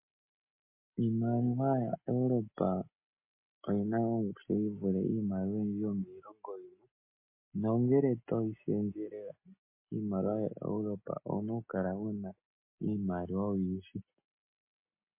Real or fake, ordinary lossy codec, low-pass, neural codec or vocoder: real; MP3, 24 kbps; 3.6 kHz; none